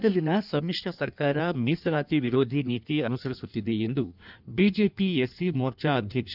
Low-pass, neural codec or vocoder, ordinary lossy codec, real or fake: 5.4 kHz; codec, 16 kHz in and 24 kHz out, 1.1 kbps, FireRedTTS-2 codec; none; fake